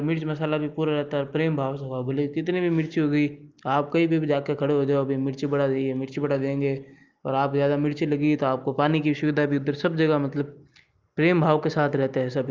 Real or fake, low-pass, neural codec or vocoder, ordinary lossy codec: real; 7.2 kHz; none; Opus, 32 kbps